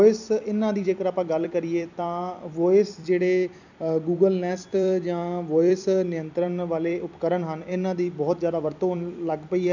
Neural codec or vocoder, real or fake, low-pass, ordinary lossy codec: vocoder, 44.1 kHz, 128 mel bands every 256 samples, BigVGAN v2; fake; 7.2 kHz; none